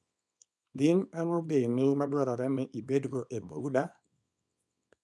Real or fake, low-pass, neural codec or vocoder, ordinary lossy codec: fake; none; codec, 24 kHz, 0.9 kbps, WavTokenizer, small release; none